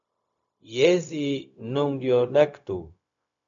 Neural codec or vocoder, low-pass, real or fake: codec, 16 kHz, 0.4 kbps, LongCat-Audio-Codec; 7.2 kHz; fake